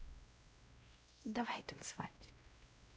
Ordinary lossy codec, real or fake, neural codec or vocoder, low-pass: none; fake; codec, 16 kHz, 0.5 kbps, X-Codec, WavLM features, trained on Multilingual LibriSpeech; none